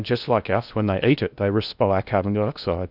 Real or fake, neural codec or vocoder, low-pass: fake; codec, 16 kHz in and 24 kHz out, 0.8 kbps, FocalCodec, streaming, 65536 codes; 5.4 kHz